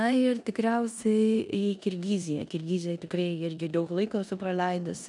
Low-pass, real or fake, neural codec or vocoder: 10.8 kHz; fake; codec, 16 kHz in and 24 kHz out, 0.9 kbps, LongCat-Audio-Codec, four codebook decoder